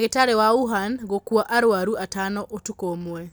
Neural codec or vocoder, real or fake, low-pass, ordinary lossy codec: none; real; none; none